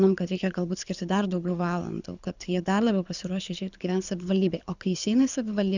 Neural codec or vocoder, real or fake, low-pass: codec, 24 kHz, 6 kbps, HILCodec; fake; 7.2 kHz